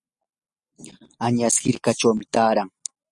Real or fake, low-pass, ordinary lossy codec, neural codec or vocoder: real; 10.8 kHz; Opus, 64 kbps; none